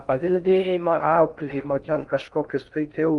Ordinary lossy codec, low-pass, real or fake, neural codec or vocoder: Opus, 32 kbps; 10.8 kHz; fake; codec, 16 kHz in and 24 kHz out, 0.6 kbps, FocalCodec, streaming, 2048 codes